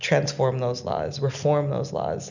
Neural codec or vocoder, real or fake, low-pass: none; real; 7.2 kHz